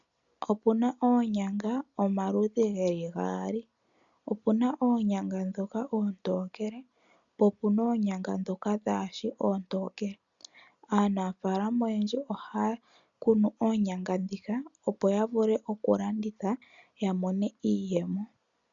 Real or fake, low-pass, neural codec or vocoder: real; 7.2 kHz; none